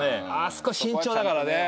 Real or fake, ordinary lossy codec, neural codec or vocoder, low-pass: real; none; none; none